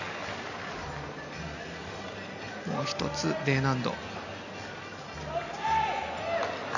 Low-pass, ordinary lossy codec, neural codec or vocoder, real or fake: 7.2 kHz; none; none; real